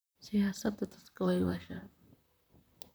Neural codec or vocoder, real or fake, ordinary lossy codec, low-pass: vocoder, 44.1 kHz, 128 mel bands, Pupu-Vocoder; fake; none; none